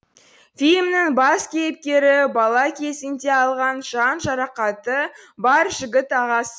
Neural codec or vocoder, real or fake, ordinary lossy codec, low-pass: none; real; none; none